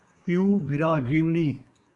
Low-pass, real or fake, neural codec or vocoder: 10.8 kHz; fake; codec, 24 kHz, 1 kbps, SNAC